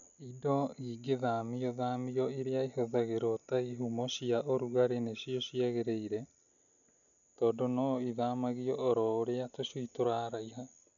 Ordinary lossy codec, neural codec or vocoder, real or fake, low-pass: none; none; real; 7.2 kHz